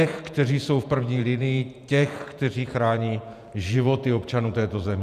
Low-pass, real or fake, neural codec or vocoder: 14.4 kHz; fake; vocoder, 48 kHz, 128 mel bands, Vocos